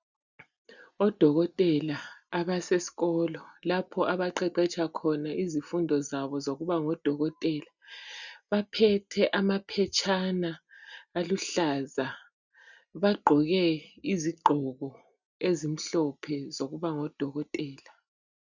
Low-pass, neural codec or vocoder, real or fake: 7.2 kHz; none; real